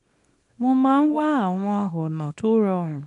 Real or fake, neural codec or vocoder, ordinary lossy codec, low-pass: fake; codec, 24 kHz, 0.9 kbps, WavTokenizer, small release; none; 10.8 kHz